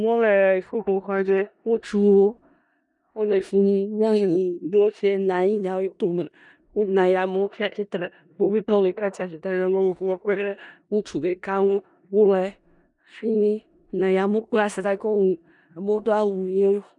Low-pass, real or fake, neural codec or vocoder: 10.8 kHz; fake; codec, 16 kHz in and 24 kHz out, 0.4 kbps, LongCat-Audio-Codec, four codebook decoder